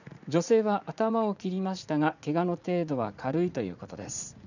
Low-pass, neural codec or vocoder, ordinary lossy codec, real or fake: 7.2 kHz; vocoder, 44.1 kHz, 80 mel bands, Vocos; none; fake